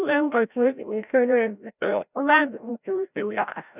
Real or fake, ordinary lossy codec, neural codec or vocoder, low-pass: fake; none; codec, 16 kHz, 0.5 kbps, FreqCodec, larger model; 3.6 kHz